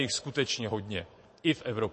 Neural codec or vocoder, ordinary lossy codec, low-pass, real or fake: vocoder, 24 kHz, 100 mel bands, Vocos; MP3, 32 kbps; 10.8 kHz; fake